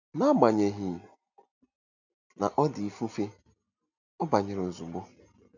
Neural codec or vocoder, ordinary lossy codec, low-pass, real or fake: none; none; 7.2 kHz; real